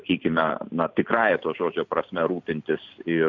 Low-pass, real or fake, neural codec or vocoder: 7.2 kHz; fake; vocoder, 44.1 kHz, 128 mel bands every 256 samples, BigVGAN v2